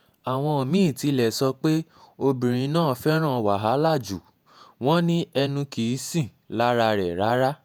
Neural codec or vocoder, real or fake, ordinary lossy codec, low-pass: vocoder, 48 kHz, 128 mel bands, Vocos; fake; none; none